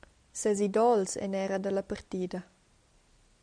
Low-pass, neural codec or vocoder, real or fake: 9.9 kHz; none; real